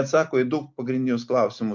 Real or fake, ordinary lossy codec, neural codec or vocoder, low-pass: real; MP3, 48 kbps; none; 7.2 kHz